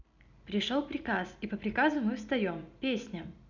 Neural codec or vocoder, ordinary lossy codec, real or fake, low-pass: none; none; real; 7.2 kHz